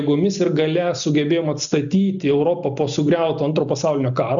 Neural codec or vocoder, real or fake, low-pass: none; real; 7.2 kHz